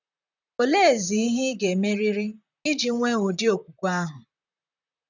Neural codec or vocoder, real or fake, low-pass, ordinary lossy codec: vocoder, 44.1 kHz, 128 mel bands, Pupu-Vocoder; fake; 7.2 kHz; none